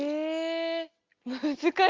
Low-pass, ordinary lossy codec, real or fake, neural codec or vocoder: 7.2 kHz; Opus, 32 kbps; real; none